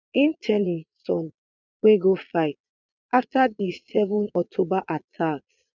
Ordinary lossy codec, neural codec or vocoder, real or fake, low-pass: none; vocoder, 22.05 kHz, 80 mel bands, Vocos; fake; 7.2 kHz